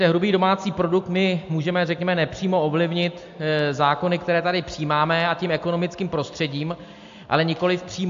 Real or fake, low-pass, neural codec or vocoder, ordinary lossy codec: real; 7.2 kHz; none; AAC, 64 kbps